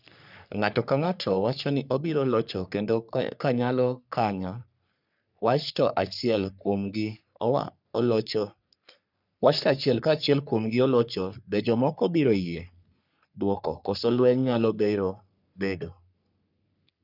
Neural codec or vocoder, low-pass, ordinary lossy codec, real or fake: codec, 44.1 kHz, 3.4 kbps, Pupu-Codec; 5.4 kHz; none; fake